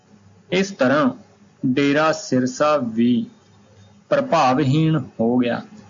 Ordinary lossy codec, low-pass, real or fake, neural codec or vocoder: MP3, 48 kbps; 7.2 kHz; real; none